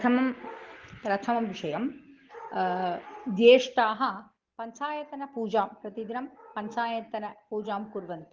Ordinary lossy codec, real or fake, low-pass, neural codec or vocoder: Opus, 16 kbps; real; 7.2 kHz; none